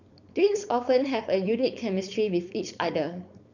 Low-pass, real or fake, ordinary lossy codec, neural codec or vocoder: 7.2 kHz; fake; none; codec, 16 kHz, 4.8 kbps, FACodec